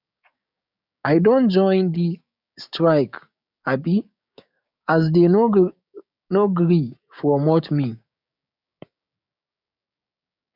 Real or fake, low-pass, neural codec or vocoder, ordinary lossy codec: fake; 5.4 kHz; codec, 16 kHz, 6 kbps, DAC; none